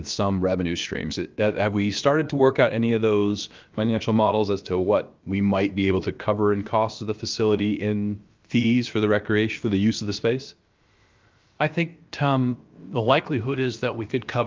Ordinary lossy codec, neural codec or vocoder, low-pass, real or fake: Opus, 24 kbps; codec, 16 kHz, about 1 kbps, DyCAST, with the encoder's durations; 7.2 kHz; fake